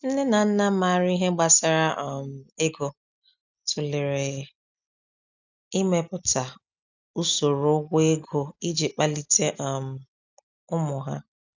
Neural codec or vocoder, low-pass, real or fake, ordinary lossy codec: none; 7.2 kHz; real; none